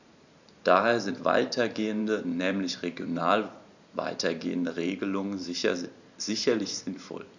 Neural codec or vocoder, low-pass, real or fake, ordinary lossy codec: vocoder, 44.1 kHz, 128 mel bands every 512 samples, BigVGAN v2; 7.2 kHz; fake; none